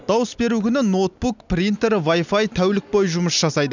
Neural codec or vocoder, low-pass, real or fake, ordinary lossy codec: none; 7.2 kHz; real; none